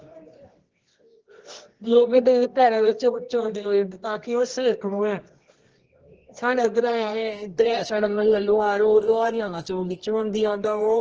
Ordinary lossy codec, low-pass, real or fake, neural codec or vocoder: Opus, 16 kbps; 7.2 kHz; fake; codec, 24 kHz, 0.9 kbps, WavTokenizer, medium music audio release